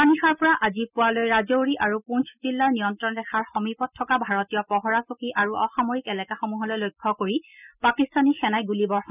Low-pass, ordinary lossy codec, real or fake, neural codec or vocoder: 3.6 kHz; none; real; none